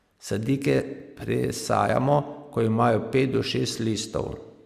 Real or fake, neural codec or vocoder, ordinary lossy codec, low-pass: real; none; Opus, 64 kbps; 14.4 kHz